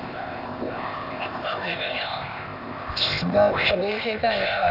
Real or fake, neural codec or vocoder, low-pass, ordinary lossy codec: fake; codec, 16 kHz, 0.8 kbps, ZipCodec; 5.4 kHz; none